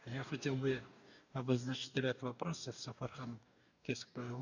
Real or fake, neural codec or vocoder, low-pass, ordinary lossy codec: fake; codec, 44.1 kHz, 2.6 kbps, DAC; 7.2 kHz; none